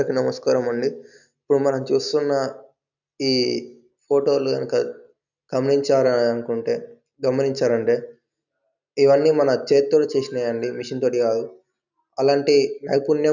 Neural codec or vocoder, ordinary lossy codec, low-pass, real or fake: none; none; 7.2 kHz; real